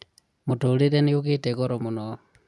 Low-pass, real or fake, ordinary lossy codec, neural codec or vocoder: none; real; none; none